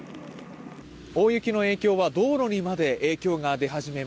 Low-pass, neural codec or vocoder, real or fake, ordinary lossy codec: none; none; real; none